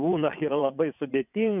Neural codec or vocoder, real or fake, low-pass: vocoder, 22.05 kHz, 80 mel bands, WaveNeXt; fake; 3.6 kHz